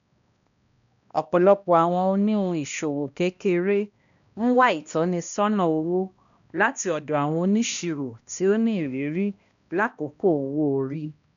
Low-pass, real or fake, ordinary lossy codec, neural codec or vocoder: 7.2 kHz; fake; none; codec, 16 kHz, 1 kbps, X-Codec, HuBERT features, trained on balanced general audio